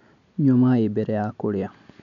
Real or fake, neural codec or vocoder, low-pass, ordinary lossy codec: real; none; 7.2 kHz; MP3, 96 kbps